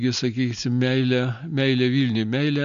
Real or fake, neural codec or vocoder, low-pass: real; none; 7.2 kHz